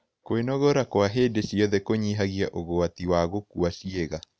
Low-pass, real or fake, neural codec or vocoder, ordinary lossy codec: none; real; none; none